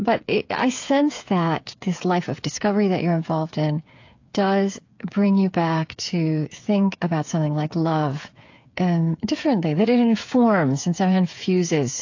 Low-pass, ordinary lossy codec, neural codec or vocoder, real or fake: 7.2 kHz; AAC, 48 kbps; codec, 16 kHz, 8 kbps, FreqCodec, smaller model; fake